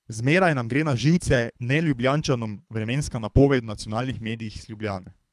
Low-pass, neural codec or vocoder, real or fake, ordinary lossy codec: none; codec, 24 kHz, 3 kbps, HILCodec; fake; none